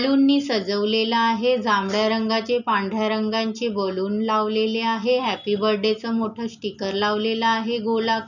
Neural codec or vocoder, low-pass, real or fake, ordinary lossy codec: none; 7.2 kHz; real; none